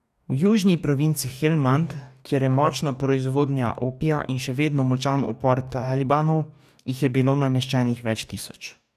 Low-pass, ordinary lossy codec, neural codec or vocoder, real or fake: 14.4 kHz; none; codec, 44.1 kHz, 2.6 kbps, DAC; fake